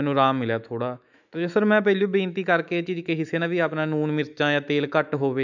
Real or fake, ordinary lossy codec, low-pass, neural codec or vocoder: fake; none; 7.2 kHz; autoencoder, 48 kHz, 128 numbers a frame, DAC-VAE, trained on Japanese speech